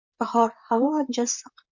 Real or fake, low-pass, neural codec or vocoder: fake; 7.2 kHz; vocoder, 44.1 kHz, 128 mel bands, Pupu-Vocoder